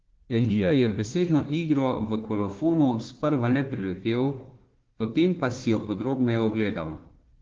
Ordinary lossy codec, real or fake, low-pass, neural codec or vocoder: Opus, 16 kbps; fake; 7.2 kHz; codec, 16 kHz, 1 kbps, FunCodec, trained on Chinese and English, 50 frames a second